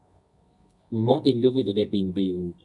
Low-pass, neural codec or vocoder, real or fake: 10.8 kHz; codec, 24 kHz, 0.9 kbps, WavTokenizer, medium music audio release; fake